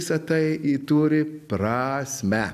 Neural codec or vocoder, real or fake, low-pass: none; real; 14.4 kHz